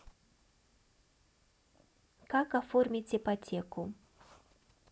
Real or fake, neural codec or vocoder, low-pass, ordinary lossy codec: real; none; none; none